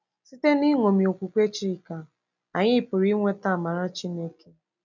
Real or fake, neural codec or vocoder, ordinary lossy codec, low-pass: real; none; none; 7.2 kHz